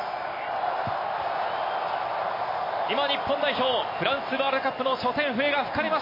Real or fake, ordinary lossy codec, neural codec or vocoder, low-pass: real; MP3, 24 kbps; none; 5.4 kHz